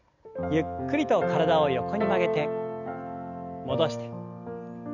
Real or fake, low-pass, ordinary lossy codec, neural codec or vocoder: real; 7.2 kHz; none; none